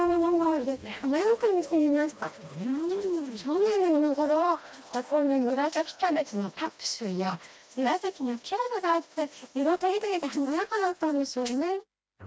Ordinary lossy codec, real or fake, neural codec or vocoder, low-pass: none; fake; codec, 16 kHz, 1 kbps, FreqCodec, smaller model; none